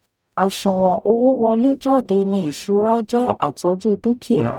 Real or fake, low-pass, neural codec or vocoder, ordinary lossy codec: fake; 19.8 kHz; codec, 44.1 kHz, 0.9 kbps, DAC; none